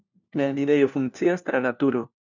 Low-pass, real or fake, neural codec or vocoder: 7.2 kHz; fake; codec, 16 kHz, 1 kbps, FunCodec, trained on LibriTTS, 50 frames a second